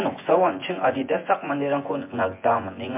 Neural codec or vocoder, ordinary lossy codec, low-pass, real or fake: vocoder, 24 kHz, 100 mel bands, Vocos; MP3, 24 kbps; 3.6 kHz; fake